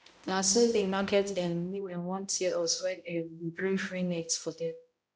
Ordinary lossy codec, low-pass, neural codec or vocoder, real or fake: none; none; codec, 16 kHz, 0.5 kbps, X-Codec, HuBERT features, trained on balanced general audio; fake